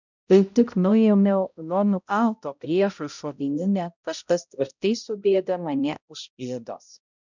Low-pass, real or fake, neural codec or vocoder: 7.2 kHz; fake; codec, 16 kHz, 0.5 kbps, X-Codec, HuBERT features, trained on balanced general audio